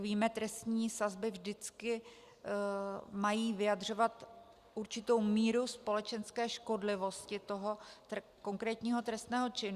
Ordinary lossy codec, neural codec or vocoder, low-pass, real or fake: Opus, 64 kbps; none; 14.4 kHz; real